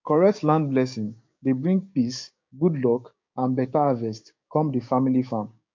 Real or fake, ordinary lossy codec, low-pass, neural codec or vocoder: fake; MP3, 48 kbps; 7.2 kHz; codec, 16 kHz, 6 kbps, DAC